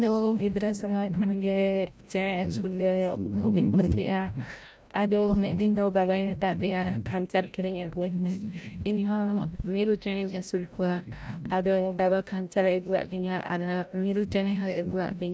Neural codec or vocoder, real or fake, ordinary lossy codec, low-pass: codec, 16 kHz, 0.5 kbps, FreqCodec, larger model; fake; none; none